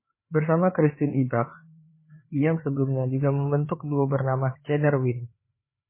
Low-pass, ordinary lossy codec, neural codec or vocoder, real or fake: 3.6 kHz; MP3, 16 kbps; codec, 16 kHz, 4 kbps, FreqCodec, larger model; fake